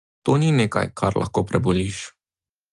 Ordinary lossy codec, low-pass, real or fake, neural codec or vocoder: Opus, 32 kbps; 10.8 kHz; real; none